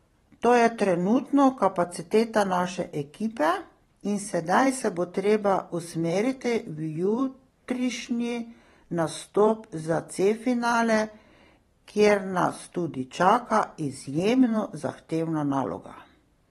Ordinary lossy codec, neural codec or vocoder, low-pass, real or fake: AAC, 32 kbps; vocoder, 44.1 kHz, 128 mel bands every 512 samples, BigVGAN v2; 19.8 kHz; fake